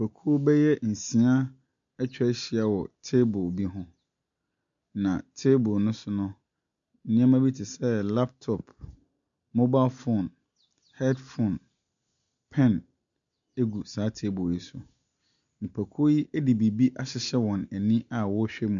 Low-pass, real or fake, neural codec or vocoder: 7.2 kHz; real; none